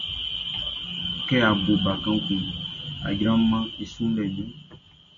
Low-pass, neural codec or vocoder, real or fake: 7.2 kHz; none; real